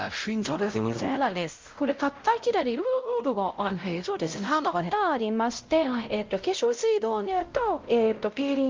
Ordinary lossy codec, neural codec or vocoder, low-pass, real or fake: Opus, 32 kbps; codec, 16 kHz, 0.5 kbps, X-Codec, WavLM features, trained on Multilingual LibriSpeech; 7.2 kHz; fake